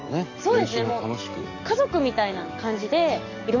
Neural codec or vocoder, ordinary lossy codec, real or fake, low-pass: codec, 44.1 kHz, 7.8 kbps, DAC; none; fake; 7.2 kHz